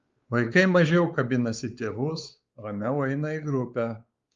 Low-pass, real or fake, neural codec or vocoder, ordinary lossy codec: 7.2 kHz; fake; codec, 16 kHz, 4 kbps, X-Codec, WavLM features, trained on Multilingual LibriSpeech; Opus, 32 kbps